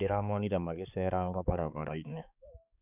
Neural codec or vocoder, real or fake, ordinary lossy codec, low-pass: codec, 16 kHz, 4 kbps, X-Codec, HuBERT features, trained on balanced general audio; fake; none; 3.6 kHz